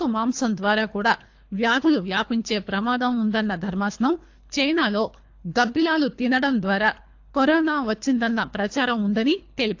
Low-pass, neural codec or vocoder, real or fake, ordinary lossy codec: 7.2 kHz; codec, 24 kHz, 3 kbps, HILCodec; fake; AAC, 48 kbps